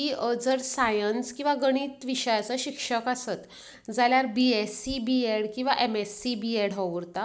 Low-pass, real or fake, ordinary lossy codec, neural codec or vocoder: none; real; none; none